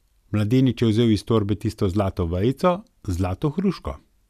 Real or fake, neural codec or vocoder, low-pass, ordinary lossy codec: real; none; 14.4 kHz; none